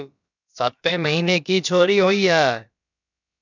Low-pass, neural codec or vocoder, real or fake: 7.2 kHz; codec, 16 kHz, about 1 kbps, DyCAST, with the encoder's durations; fake